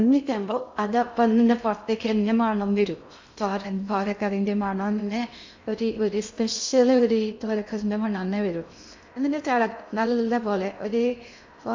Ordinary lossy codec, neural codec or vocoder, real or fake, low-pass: MP3, 64 kbps; codec, 16 kHz in and 24 kHz out, 0.6 kbps, FocalCodec, streaming, 2048 codes; fake; 7.2 kHz